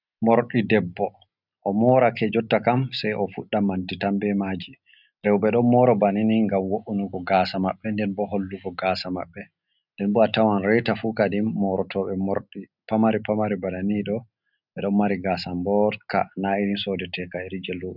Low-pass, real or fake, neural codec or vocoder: 5.4 kHz; real; none